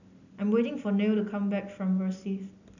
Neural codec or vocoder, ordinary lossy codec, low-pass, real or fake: none; none; 7.2 kHz; real